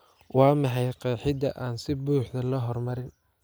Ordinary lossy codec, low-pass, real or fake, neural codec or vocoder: none; none; real; none